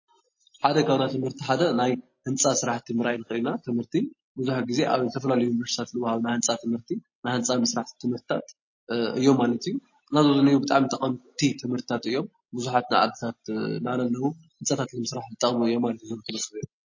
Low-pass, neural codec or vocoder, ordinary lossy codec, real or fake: 7.2 kHz; none; MP3, 32 kbps; real